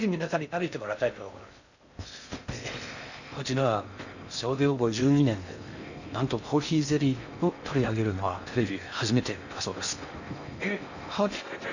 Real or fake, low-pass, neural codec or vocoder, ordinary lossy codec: fake; 7.2 kHz; codec, 16 kHz in and 24 kHz out, 0.6 kbps, FocalCodec, streaming, 2048 codes; none